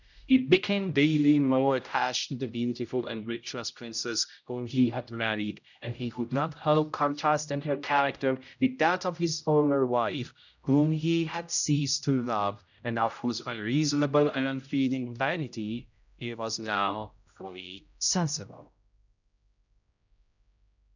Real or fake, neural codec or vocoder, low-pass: fake; codec, 16 kHz, 0.5 kbps, X-Codec, HuBERT features, trained on general audio; 7.2 kHz